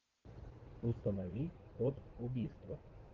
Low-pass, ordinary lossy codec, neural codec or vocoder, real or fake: 7.2 kHz; Opus, 16 kbps; codec, 16 kHz in and 24 kHz out, 2.2 kbps, FireRedTTS-2 codec; fake